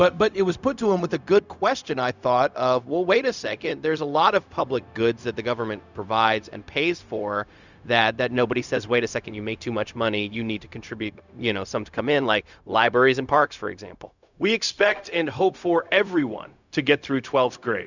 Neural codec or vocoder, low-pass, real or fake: codec, 16 kHz, 0.4 kbps, LongCat-Audio-Codec; 7.2 kHz; fake